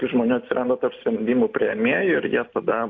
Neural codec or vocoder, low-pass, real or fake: none; 7.2 kHz; real